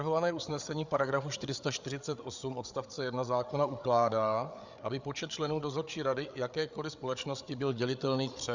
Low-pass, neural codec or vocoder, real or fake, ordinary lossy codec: 7.2 kHz; codec, 16 kHz, 8 kbps, FreqCodec, larger model; fake; Opus, 64 kbps